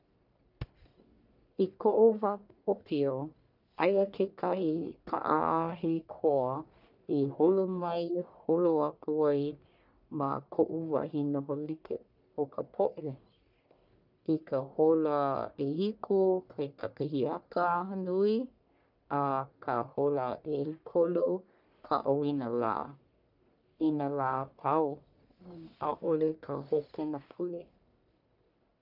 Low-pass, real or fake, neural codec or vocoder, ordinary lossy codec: 5.4 kHz; fake; codec, 44.1 kHz, 1.7 kbps, Pupu-Codec; none